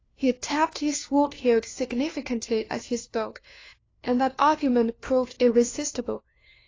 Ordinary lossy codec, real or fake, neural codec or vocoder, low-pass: AAC, 32 kbps; fake; codec, 16 kHz, 1 kbps, FunCodec, trained on LibriTTS, 50 frames a second; 7.2 kHz